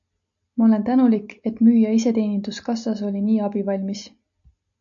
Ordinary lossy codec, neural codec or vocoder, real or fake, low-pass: AAC, 64 kbps; none; real; 7.2 kHz